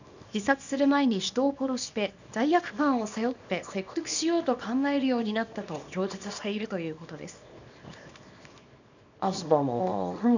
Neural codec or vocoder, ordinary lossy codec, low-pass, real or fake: codec, 24 kHz, 0.9 kbps, WavTokenizer, small release; none; 7.2 kHz; fake